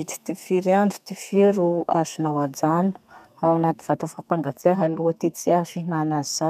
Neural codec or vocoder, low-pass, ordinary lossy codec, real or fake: codec, 32 kHz, 1.9 kbps, SNAC; 14.4 kHz; none; fake